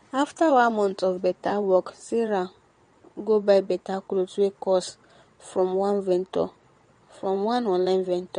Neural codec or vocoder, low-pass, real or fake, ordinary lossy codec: vocoder, 22.05 kHz, 80 mel bands, WaveNeXt; 9.9 kHz; fake; MP3, 48 kbps